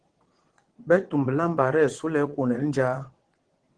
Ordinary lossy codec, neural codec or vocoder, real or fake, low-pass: Opus, 16 kbps; vocoder, 22.05 kHz, 80 mel bands, WaveNeXt; fake; 9.9 kHz